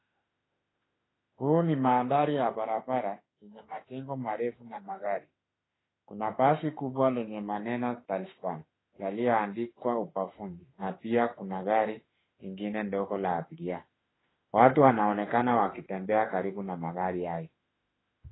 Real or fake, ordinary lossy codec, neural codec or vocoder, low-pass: fake; AAC, 16 kbps; autoencoder, 48 kHz, 32 numbers a frame, DAC-VAE, trained on Japanese speech; 7.2 kHz